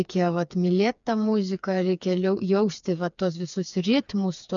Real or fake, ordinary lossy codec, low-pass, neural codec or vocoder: fake; MP3, 96 kbps; 7.2 kHz; codec, 16 kHz, 4 kbps, FreqCodec, smaller model